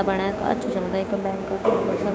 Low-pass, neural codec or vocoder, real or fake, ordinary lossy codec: none; codec, 16 kHz, 6 kbps, DAC; fake; none